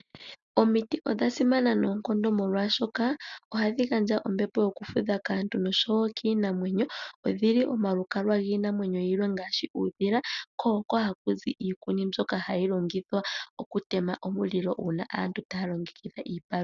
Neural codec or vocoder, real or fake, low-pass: none; real; 7.2 kHz